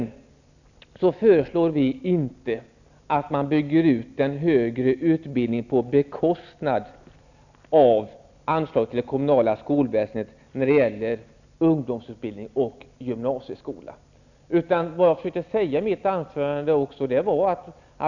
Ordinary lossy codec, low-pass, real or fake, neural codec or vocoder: none; 7.2 kHz; real; none